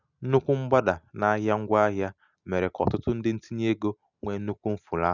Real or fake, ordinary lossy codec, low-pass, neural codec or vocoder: real; none; 7.2 kHz; none